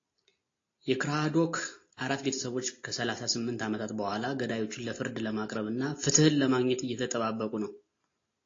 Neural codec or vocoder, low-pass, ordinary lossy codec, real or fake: none; 7.2 kHz; AAC, 32 kbps; real